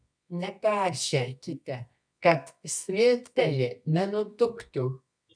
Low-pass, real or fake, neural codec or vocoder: 9.9 kHz; fake; codec, 24 kHz, 0.9 kbps, WavTokenizer, medium music audio release